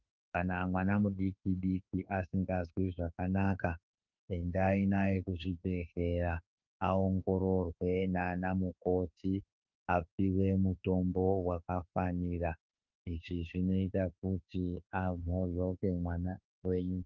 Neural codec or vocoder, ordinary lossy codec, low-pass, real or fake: autoencoder, 48 kHz, 32 numbers a frame, DAC-VAE, trained on Japanese speech; Opus, 24 kbps; 7.2 kHz; fake